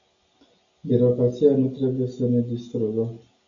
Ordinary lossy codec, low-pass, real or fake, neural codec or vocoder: AAC, 64 kbps; 7.2 kHz; real; none